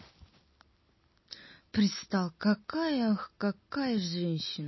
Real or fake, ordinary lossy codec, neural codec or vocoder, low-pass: real; MP3, 24 kbps; none; 7.2 kHz